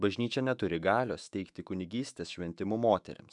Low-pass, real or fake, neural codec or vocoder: 10.8 kHz; fake; autoencoder, 48 kHz, 128 numbers a frame, DAC-VAE, trained on Japanese speech